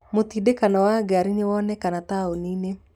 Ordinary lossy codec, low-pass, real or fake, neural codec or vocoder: none; 19.8 kHz; real; none